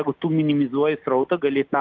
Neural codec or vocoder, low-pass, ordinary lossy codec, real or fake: none; 7.2 kHz; Opus, 24 kbps; real